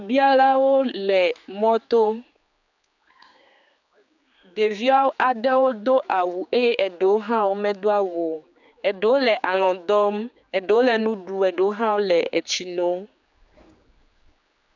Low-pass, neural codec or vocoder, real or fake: 7.2 kHz; codec, 16 kHz, 4 kbps, X-Codec, HuBERT features, trained on general audio; fake